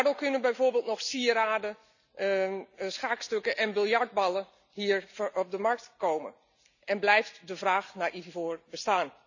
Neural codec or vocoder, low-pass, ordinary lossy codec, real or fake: none; 7.2 kHz; none; real